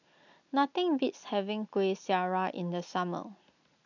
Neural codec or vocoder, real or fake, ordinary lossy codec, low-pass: none; real; none; 7.2 kHz